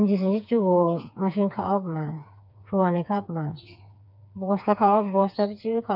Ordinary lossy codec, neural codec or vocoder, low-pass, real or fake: none; codec, 16 kHz, 4 kbps, FreqCodec, smaller model; 5.4 kHz; fake